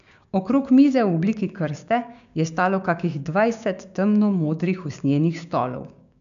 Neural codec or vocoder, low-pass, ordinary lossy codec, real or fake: codec, 16 kHz, 6 kbps, DAC; 7.2 kHz; none; fake